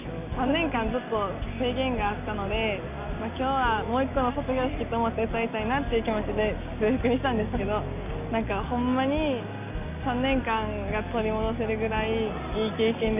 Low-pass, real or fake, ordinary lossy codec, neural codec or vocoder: 3.6 kHz; real; none; none